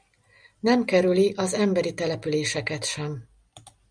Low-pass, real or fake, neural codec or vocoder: 9.9 kHz; real; none